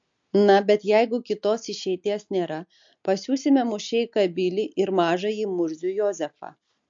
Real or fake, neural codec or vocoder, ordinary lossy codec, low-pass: real; none; MP3, 48 kbps; 7.2 kHz